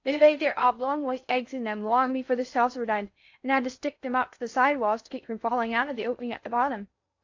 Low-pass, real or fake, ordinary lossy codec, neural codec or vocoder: 7.2 kHz; fake; AAC, 48 kbps; codec, 16 kHz in and 24 kHz out, 0.6 kbps, FocalCodec, streaming, 4096 codes